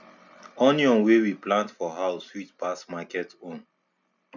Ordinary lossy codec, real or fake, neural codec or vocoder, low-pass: none; real; none; 7.2 kHz